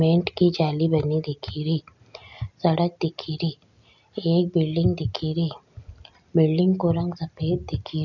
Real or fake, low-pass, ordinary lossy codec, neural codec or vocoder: real; 7.2 kHz; none; none